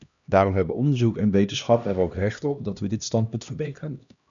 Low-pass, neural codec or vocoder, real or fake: 7.2 kHz; codec, 16 kHz, 1 kbps, X-Codec, HuBERT features, trained on LibriSpeech; fake